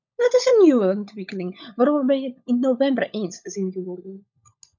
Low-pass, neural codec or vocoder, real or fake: 7.2 kHz; codec, 16 kHz, 16 kbps, FunCodec, trained on LibriTTS, 50 frames a second; fake